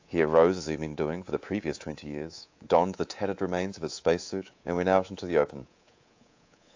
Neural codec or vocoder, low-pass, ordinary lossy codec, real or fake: codec, 24 kHz, 3.1 kbps, DualCodec; 7.2 kHz; AAC, 48 kbps; fake